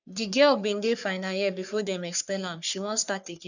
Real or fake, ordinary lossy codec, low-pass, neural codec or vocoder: fake; none; 7.2 kHz; codec, 44.1 kHz, 3.4 kbps, Pupu-Codec